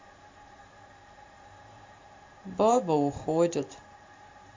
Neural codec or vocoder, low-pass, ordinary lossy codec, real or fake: vocoder, 44.1 kHz, 128 mel bands every 512 samples, BigVGAN v2; 7.2 kHz; MP3, 48 kbps; fake